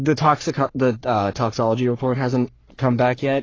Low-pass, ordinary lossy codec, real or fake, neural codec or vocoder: 7.2 kHz; AAC, 32 kbps; fake; codec, 44.1 kHz, 3.4 kbps, Pupu-Codec